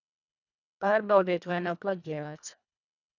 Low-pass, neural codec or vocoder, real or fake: 7.2 kHz; codec, 24 kHz, 1.5 kbps, HILCodec; fake